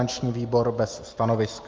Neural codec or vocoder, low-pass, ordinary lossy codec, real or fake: none; 7.2 kHz; Opus, 32 kbps; real